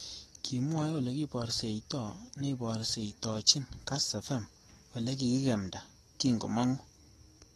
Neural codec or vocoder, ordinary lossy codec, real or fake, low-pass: codec, 44.1 kHz, 7.8 kbps, DAC; AAC, 32 kbps; fake; 19.8 kHz